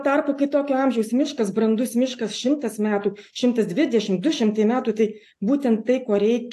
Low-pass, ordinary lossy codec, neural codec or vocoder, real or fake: 14.4 kHz; AAC, 64 kbps; none; real